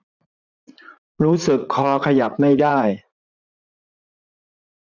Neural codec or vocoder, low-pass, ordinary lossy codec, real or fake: vocoder, 44.1 kHz, 80 mel bands, Vocos; 7.2 kHz; none; fake